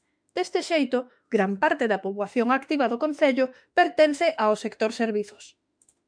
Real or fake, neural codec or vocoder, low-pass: fake; autoencoder, 48 kHz, 32 numbers a frame, DAC-VAE, trained on Japanese speech; 9.9 kHz